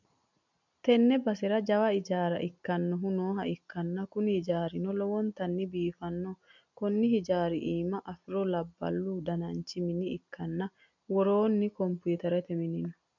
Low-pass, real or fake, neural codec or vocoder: 7.2 kHz; real; none